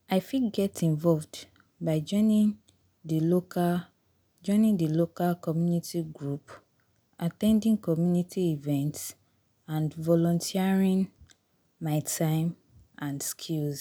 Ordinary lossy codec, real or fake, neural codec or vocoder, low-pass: none; real; none; none